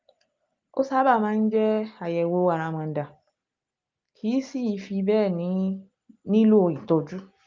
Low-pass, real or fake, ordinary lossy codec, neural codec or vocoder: 7.2 kHz; real; Opus, 24 kbps; none